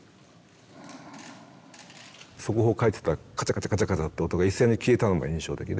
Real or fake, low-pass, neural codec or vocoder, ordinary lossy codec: real; none; none; none